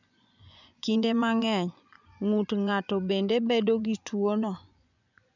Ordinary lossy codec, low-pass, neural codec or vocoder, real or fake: none; 7.2 kHz; vocoder, 24 kHz, 100 mel bands, Vocos; fake